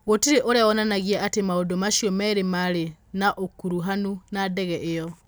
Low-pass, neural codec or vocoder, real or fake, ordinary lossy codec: none; none; real; none